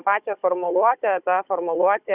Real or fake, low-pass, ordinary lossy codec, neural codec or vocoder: fake; 3.6 kHz; Opus, 64 kbps; codec, 16 kHz, 16 kbps, FunCodec, trained on Chinese and English, 50 frames a second